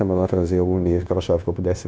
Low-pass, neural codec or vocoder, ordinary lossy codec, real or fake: none; codec, 16 kHz, 0.9 kbps, LongCat-Audio-Codec; none; fake